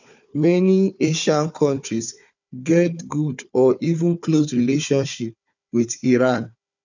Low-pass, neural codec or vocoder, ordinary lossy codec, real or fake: 7.2 kHz; codec, 16 kHz, 4 kbps, FunCodec, trained on Chinese and English, 50 frames a second; none; fake